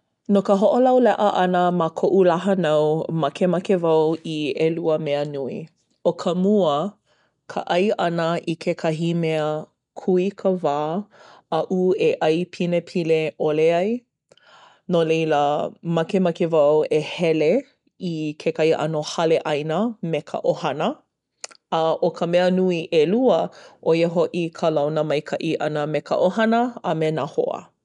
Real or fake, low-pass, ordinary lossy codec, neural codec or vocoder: real; 10.8 kHz; none; none